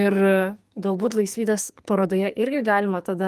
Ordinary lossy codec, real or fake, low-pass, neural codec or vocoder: Opus, 32 kbps; fake; 14.4 kHz; codec, 44.1 kHz, 2.6 kbps, SNAC